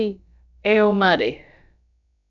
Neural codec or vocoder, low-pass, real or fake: codec, 16 kHz, about 1 kbps, DyCAST, with the encoder's durations; 7.2 kHz; fake